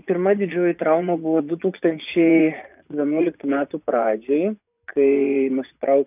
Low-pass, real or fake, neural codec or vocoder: 3.6 kHz; fake; vocoder, 24 kHz, 100 mel bands, Vocos